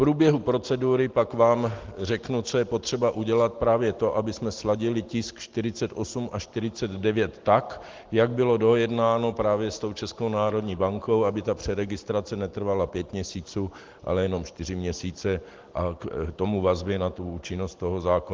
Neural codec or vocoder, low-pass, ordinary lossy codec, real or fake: none; 7.2 kHz; Opus, 16 kbps; real